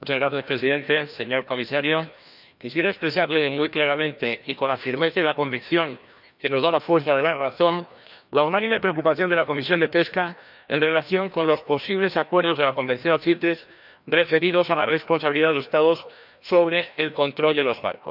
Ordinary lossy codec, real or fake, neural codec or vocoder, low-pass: none; fake; codec, 16 kHz, 1 kbps, FreqCodec, larger model; 5.4 kHz